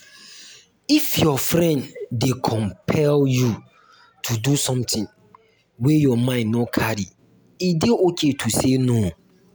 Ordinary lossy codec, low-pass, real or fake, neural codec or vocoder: none; none; real; none